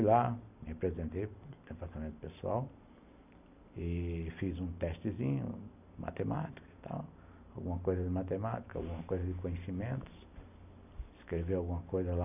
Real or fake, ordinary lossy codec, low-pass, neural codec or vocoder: real; none; 3.6 kHz; none